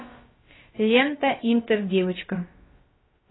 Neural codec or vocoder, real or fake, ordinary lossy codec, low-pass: codec, 16 kHz, about 1 kbps, DyCAST, with the encoder's durations; fake; AAC, 16 kbps; 7.2 kHz